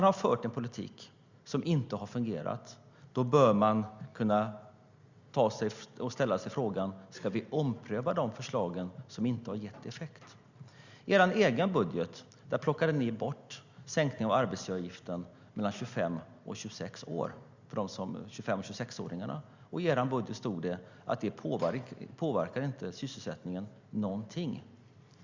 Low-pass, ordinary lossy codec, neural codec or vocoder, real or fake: 7.2 kHz; Opus, 64 kbps; none; real